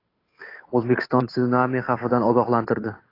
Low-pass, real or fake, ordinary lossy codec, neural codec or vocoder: 5.4 kHz; fake; AAC, 24 kbps; codec, 16 kHz, 8 kbps, FunCodec, trained on Chinese and English, 25 frames a second